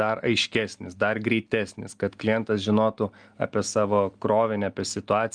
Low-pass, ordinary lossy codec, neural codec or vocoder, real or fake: 9.9 kHz; Opus, 32 kbps; none; real